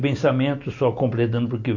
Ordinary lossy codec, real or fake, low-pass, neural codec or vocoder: none; real; 7.2 kHz; none